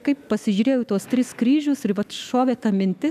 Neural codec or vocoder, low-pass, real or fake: autoencoder, 48 kHz, 128 numbers a frame, DAC-VAE, trained on Japanese speech; 14.4 kHz; fake